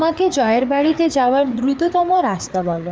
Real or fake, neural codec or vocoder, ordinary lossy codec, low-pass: fake; codec, 16 kHz, 4 kbps, FreqCodec, larger model; none; none